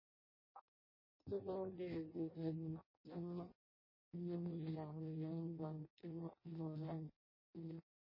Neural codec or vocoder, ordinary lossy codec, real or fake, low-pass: codec, 16 kHz in and 24 kHz out, 0.6 kbps, FireRedTTS-2 codec; MP3, 24 kbps; fake; 5.4 kHz